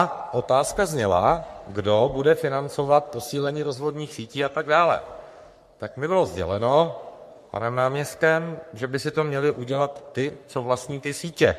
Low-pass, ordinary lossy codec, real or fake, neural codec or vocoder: 14.4 kHz; MP3, 64 kbps; fake; codec, 44.1 kHz, 3.4 kbps, Pupu-Codec